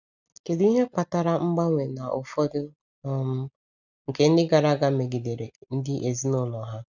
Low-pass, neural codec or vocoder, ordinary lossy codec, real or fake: 7.2 kHz; none; none; real